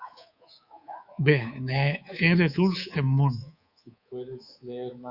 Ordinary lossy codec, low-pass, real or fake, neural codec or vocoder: Opus, 64 kbps; 5.4 kHz; fake; codec, 24 kHz, 3.1 kbps, DualCodec